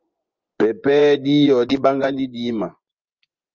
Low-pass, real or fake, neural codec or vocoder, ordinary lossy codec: 7.2 kHz; fake; vocoder, 24 kHz, 100 mel bands, Vocos; Opus, 24 kbps